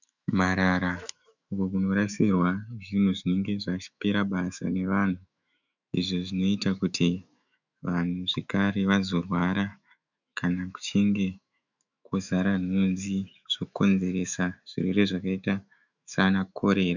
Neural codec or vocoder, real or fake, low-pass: autoencoder, 48 kHz, 128 numbers a frame, DAC-VAE, trained on Japanese speech; fake; 7.2 kHz